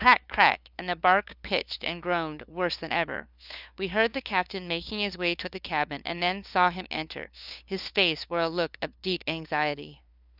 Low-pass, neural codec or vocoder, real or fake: 5.4 kHz; codec, 16 kHz, 2 kbps, FunCodec, trained on LibriTTS, 25 frames a second; fake